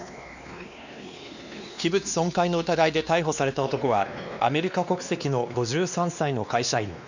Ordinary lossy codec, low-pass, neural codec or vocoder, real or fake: none; 7.2 kHz; codec, 16 kHz, 2 kbps, X-Codec, WavLM features, trained on Multilingual LibriSpeech; fake